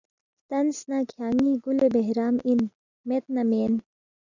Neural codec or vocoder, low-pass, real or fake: none; 7.2 kHz; real